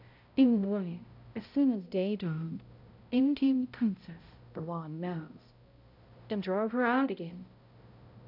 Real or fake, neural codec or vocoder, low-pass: fake; codec, 16 kHz, 0.5 kbps, X-Codec, HuBERT features, trained on balanced general audio; 5.4 kHz